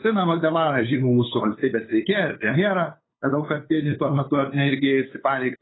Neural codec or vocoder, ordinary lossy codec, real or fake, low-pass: codec, 16 kHz, 8 kbps, FunCodec, trained on LibriTTS, 25 frames a second; AAC, 16 kbps; fake; 7.2 kHz